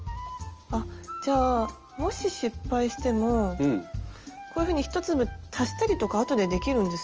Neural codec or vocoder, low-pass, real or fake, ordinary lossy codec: none; 7.2 kHz; real; Opus, 24 kbps